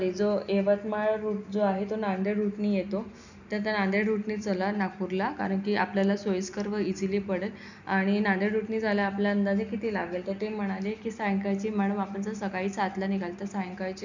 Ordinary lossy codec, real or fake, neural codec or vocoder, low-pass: none; real; none; 7.2 kHz